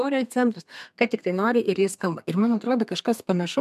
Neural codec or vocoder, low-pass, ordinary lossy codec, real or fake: codec, 32 kHz, 1.9 kbps, SNAC; 14.4 kHz; MP3, 96 kbps; fake